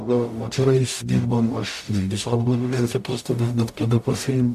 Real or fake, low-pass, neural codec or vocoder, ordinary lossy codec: fake; 14.4 kHz; codec, 44.1 kHz, 0.9 kbps, DAC; MP3, 64 kbps